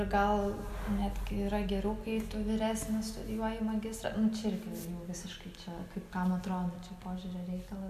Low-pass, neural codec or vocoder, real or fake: 14.4 kHz; none; real